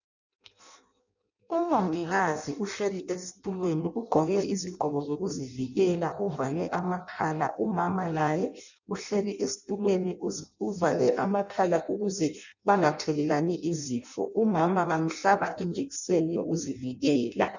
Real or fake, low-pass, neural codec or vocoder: fake; 7.2 kHz; codec, 16 kHz in and 24 kHz out, 0.6 kbps, FireRedTTS-2 codec